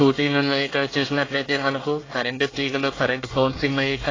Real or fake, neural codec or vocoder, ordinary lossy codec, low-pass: fake; codec, 24 kHz, 1 kbps, SNAC; AAC, 32 kbps; 7.2 kHz